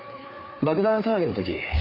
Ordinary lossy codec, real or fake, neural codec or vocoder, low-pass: none; fake; codec, 16 kHz, 4 kbps, FreqCodec, larger model; 5.4 kHz